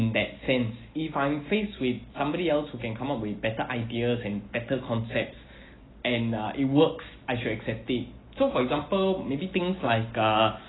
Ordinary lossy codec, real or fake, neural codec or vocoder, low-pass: AAC, 16 kbps; real; none; 7.2 kHz